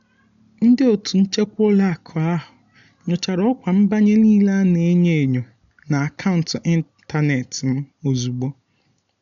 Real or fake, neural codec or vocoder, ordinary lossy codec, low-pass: real; none; none; 7.2 kHz